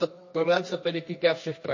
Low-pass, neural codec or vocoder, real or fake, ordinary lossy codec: 7.2 kHz; codec, 24 kHz, 0.9 kbps, WavTokenizer, medium music audio release; fake; MP3, 32 kbps